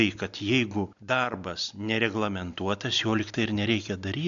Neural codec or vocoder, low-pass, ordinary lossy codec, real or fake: none; 7.2 kHz; AAC, 64 kbps; real